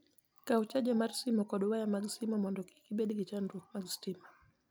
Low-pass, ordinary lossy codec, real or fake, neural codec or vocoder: none; none; real; none